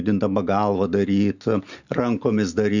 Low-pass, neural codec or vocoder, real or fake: 7.2 kHz; none; real